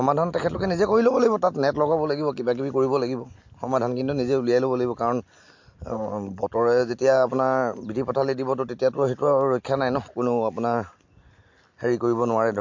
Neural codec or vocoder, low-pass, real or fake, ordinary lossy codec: none; 7.2 kHz; real; MP3, 48 kbps